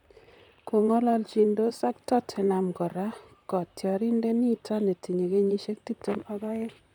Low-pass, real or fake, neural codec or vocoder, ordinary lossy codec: 19.8 kHz; fake; vocoder, 44.1 kHz, 128 mel bands, Pupu-Vocoder; none